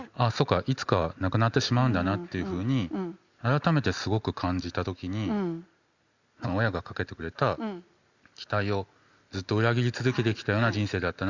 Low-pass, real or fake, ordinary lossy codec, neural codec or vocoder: 7.2 kHz; real; Opus, 64 kbps; none